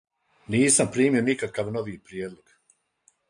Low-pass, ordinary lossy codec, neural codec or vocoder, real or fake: 9.9 kHz; MP3, 64 kbps; none; real